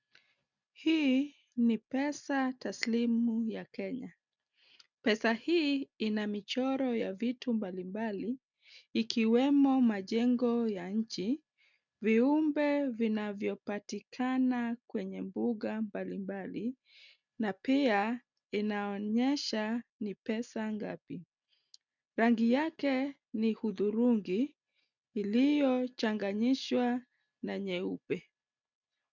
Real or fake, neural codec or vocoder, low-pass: real; none; 7.2 kHz